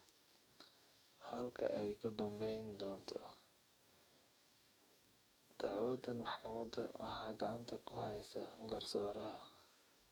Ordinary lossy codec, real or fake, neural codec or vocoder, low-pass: none; fake; codec, 44.1 kHz, 2.6 kbps, DAC; none